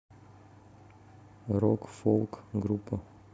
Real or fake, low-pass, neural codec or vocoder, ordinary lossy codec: real; none; none; none